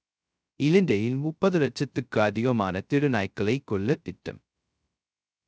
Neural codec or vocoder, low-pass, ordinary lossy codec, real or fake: codec, 16 kHz, 0.2 kbps, FocalCodec; none; none; fake